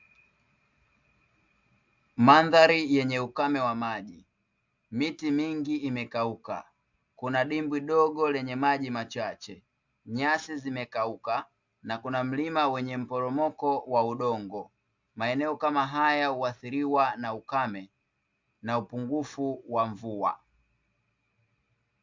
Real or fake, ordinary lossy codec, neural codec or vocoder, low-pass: real; MP3, 64 kbps; none; 7.2 kHz